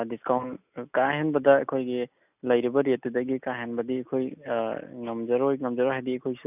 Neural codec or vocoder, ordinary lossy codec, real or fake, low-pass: none; none; real; 3.6 kHz